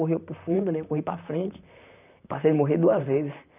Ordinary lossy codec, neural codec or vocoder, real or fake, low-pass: none; vocoder, 44.1 kHz, 128 mel bands, Pupu-Vocoder; fake; 3.6 kHz